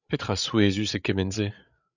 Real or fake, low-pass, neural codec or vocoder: fake; 7.2 kHz; codec, 16 kHz, 8 kbps, FreqCodec, larger model